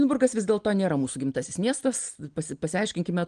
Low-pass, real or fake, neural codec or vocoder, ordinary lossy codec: 9.9 kHz; real; none; Opus, 24 kbps